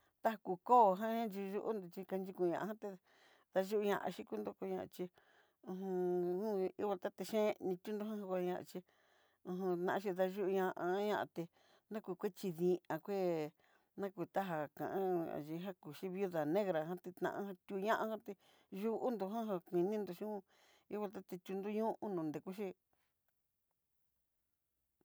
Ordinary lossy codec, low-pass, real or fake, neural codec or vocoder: none; none; real; none